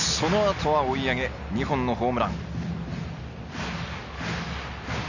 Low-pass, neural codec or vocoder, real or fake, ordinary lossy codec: 7.2 kHz; none; real; none